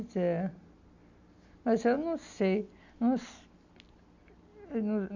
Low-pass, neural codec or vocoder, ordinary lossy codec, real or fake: 7.2 kHz; none; none; real